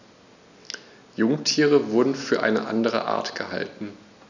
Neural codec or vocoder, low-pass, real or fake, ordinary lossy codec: none; 7.2 kHz; real; none